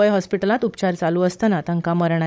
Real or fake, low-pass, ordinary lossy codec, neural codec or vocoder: fake; none; none; codec, 16 kHz, 16 kbps, FunCodec, trained on Chinese and English, 50 frames a second